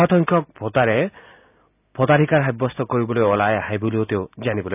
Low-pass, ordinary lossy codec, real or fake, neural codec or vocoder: 3.6 kHz; none; real; none